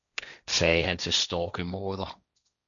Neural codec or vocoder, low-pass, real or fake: codec, 16 kHz, 1.1 kbps, Voila-Tokenizer; 7.2 kHz; fake